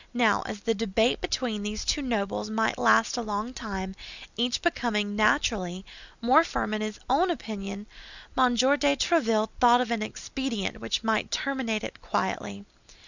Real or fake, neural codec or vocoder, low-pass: real; none; 7.2 kHz